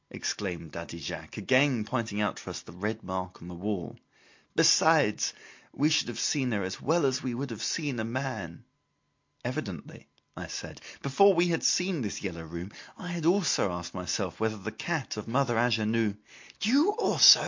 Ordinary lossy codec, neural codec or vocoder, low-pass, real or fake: MP3, 48 kbps; none; 7.2 kHz; real